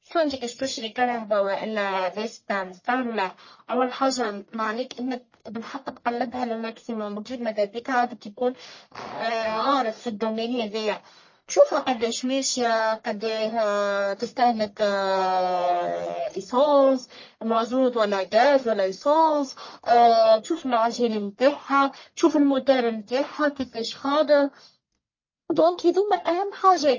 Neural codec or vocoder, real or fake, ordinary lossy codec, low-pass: codec, 44.1 kHz, 1.7 kbps, Pupu-Codec; fake; MP3, 32 kbps; 7.2 kHz